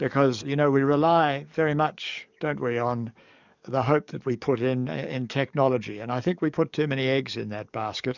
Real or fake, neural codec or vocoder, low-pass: fake; codec, 44.1 kHz, 7.8 kbps, DAC; 7.2 kHz